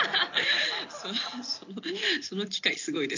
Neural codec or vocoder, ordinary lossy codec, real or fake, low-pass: none; AAC, 48 kbps; real; 7.2 kHz